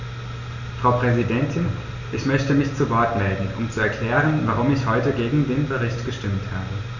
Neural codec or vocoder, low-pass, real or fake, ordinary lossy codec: none; 7.2 kHz; real; none